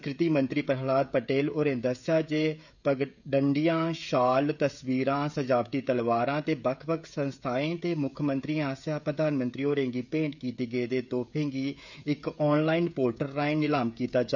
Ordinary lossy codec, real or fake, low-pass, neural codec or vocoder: none; fake; 7.2 kHz; codec, 16 kHz, 16 kbps, FreqCodec, smaller model